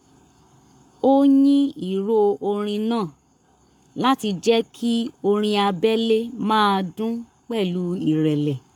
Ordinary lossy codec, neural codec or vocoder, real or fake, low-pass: none; codec, 44.1 kHz, 7.8 kbps, Pupu-Codec; fake; 19.8 kHz